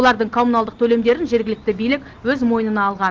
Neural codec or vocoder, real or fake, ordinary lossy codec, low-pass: none; real; Opus, 16 kbps; 7.2 kHz